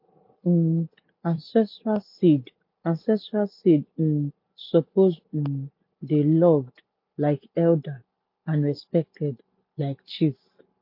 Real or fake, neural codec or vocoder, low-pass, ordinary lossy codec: real; none; 5.4 kHz; MP3, 32 kbps